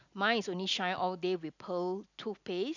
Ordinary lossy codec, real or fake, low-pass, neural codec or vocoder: none; real; 7.2 kHz; none